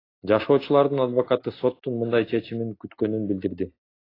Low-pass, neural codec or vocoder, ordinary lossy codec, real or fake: 5.4 kHz; none; AAC, 24 kbps; real